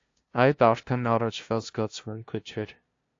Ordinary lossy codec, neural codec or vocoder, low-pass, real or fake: AAC, 48 kbps; codec, 16 kHz, 0.5 kbps, FunCodec, trained on LibriTTS, 25 frames a second; 7.2 kHz; fake